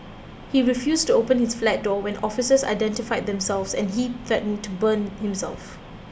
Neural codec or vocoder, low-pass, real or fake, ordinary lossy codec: none; none; real; none